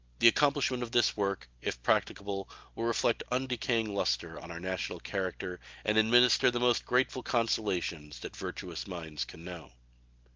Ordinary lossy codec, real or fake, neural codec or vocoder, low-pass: Opus, 32 kbps; real; none; 7.2 kHz